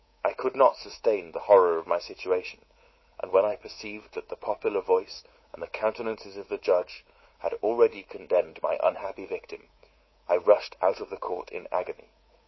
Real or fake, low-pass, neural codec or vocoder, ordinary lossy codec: fake; 7.2 kHz; codec, 24 kHz, 3.1 kbps, DualCodec; MP3, 24 kbps